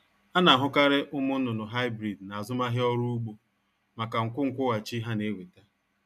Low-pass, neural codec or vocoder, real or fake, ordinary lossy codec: 14.4 kHz; none; real; none